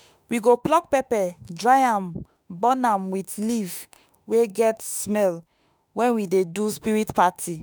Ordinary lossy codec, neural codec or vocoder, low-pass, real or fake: none; autoencoder, 48 kHz, 32 numbers a frame, DAC-VAE, trained on Japanese speech; none; fake